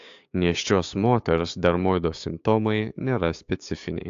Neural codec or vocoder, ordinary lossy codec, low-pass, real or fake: codec, 16 kHz, 6 kbps, DAC; AAC, 64 kbps; 7.2 kHz; fake